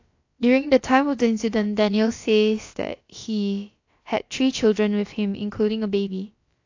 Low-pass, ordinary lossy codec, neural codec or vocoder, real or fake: 7.2 kHz; AAC, 48 kbps; codec, 16 kHz, about 1 kbps, DyCAST, with the encoder's durations; fake